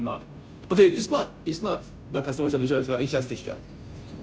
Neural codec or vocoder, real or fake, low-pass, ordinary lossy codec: codec, 16 kHz, 0.5 kbps, FunCodec, trained on Chinese and English, 25 frames a second; fake; none; none